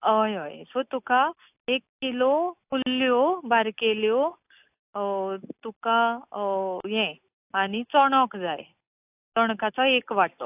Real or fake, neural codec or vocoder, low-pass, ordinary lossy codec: real; none; 3.6 kHz; AAC, 32 kbps